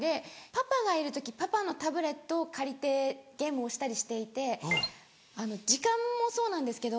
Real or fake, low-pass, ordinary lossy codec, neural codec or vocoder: real; none; none; none